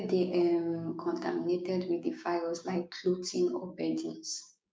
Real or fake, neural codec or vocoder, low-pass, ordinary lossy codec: fake; codec, 16 kHz, 6 kbps, DAC; none; none